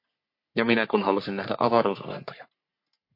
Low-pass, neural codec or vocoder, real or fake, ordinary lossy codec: 5.4 kHz; codec, 44.1 kHz, 3.4 kbps, Pupu-Codec; fake; MP3, 32 kbps